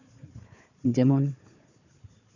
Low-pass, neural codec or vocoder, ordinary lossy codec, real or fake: 7.2 kHz; codec, 16 kHz, 4 kbps, FunCodec, trained on Chinese and English, 50 frames a second; none; fake